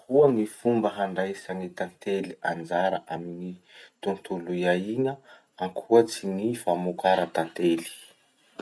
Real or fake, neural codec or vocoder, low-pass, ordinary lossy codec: real; none; none; none